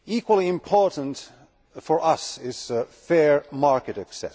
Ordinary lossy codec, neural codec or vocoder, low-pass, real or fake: none; none; none; real